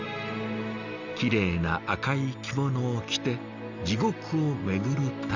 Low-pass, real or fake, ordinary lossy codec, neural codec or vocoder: 7.2 kHz; real; Opus, 64 kbps; none